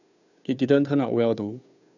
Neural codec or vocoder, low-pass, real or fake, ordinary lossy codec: codec, 16 kHz, 2 kbps, FunCodec, trained on Chinese and English, 25 frames a second; 7.2 kHz; fake; none